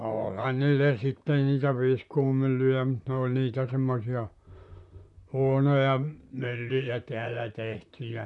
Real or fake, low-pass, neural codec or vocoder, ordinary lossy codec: fake; 10.8 kHz; vocoder, 44.1 kHz, 128 mel bands, Pupu-Vocoder; none